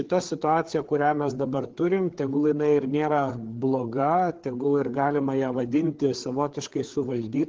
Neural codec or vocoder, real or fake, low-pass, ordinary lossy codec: codec, 16 kHz, 4 kbps, FreqCodec, larger model; fake; 7.2 kHz; Opus, 16 kbps